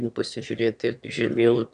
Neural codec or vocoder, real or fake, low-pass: autoencoder, 22.05 kHz, a latent of 192 numbers a frame, VITS, trained on one speaker; fake; 9.9 kHz